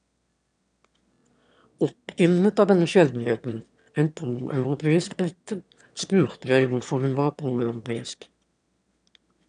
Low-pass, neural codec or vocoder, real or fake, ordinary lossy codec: 9.9 kHz; autoencoder, 22.05 kHz, a latent of 192 numbers a frame, VITS, trained on one speaker; fake; none